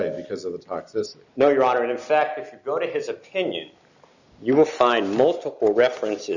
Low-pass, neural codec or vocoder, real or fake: 7.2 kHz; none; real